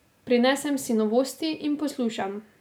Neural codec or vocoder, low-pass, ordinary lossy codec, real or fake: none; none; none; real